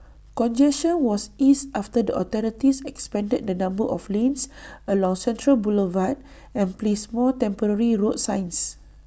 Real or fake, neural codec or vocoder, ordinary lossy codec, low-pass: real; none; none; none